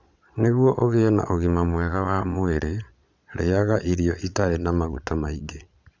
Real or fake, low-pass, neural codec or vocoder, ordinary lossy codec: fake; 7.2 kHz; vocoder, 22.05 kHz, 80 mel bands, Vocos; none